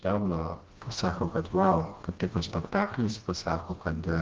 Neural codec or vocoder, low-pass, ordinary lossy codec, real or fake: codec, 16 kHz, 1 kbps, FreqCodec, smaller model; 7.2 kHz; Opus, 32 kbps; fake